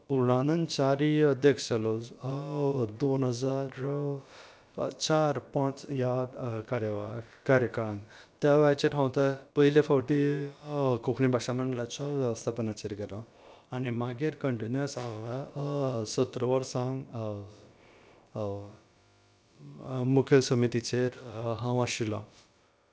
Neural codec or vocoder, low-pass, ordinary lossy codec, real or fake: codec, 16 kHz, about 1 kbps, DyCAST, with the encoder's durations; none; none; fake